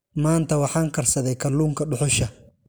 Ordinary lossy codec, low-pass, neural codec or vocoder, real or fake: none; none; none; real